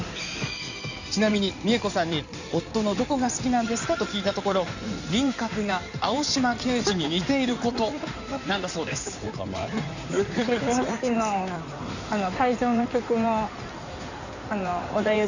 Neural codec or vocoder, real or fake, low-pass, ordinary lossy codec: codec, 16 kHz in and 24 kHz out, 2.2 kbps, FireRedTTS-2 codec; fake; 7.2 kHz; none